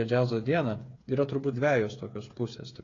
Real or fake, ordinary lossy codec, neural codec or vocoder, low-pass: fake; AAC, 32 kbps; codec, 16 kHz, 8 kbps, FreqCodec, smaller model; 7.2 kHz